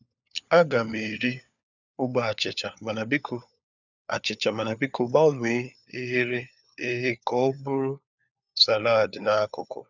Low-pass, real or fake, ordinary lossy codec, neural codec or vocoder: 7.2 kHz; fake; none; codec, 16 kHz, 4 kbps, FunCodec, trained on LibriTTS, 50 frames a second